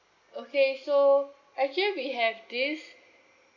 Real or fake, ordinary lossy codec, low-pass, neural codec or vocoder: real; none; 7.2 kHz; none